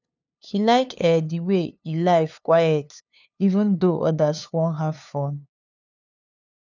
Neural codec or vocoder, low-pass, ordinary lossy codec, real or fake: codec, 16 kHz, 2 kbps, FunCodec, trained on LibriTTS, 25 frames a second; 7.2 kHz; none; fake